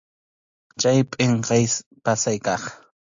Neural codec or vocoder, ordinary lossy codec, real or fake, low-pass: none; AAC, 64 kbps; real; 7.2 kHz